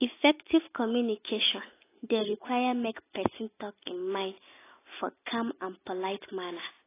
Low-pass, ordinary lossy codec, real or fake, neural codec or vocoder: 3.6 kHz; AAC, 16 kbps; real; none